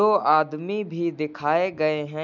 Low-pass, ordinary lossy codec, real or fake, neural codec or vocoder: 7.2 kHz; none; real; none